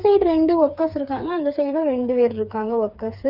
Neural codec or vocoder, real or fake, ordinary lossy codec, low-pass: codec, 16 kHz, 4 kbps, FreqCodec, smaller model; fake; none; 5.4 kHz